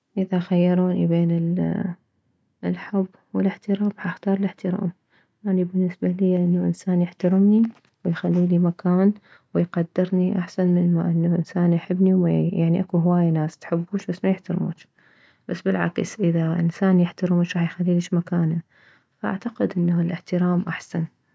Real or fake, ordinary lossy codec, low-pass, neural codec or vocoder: real; none; none; none